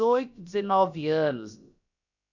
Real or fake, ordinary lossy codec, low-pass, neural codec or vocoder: fake; none; 7.2 kHz; codec, 16 kHz, about 1 kbps, DyCAST, with the encoder's durations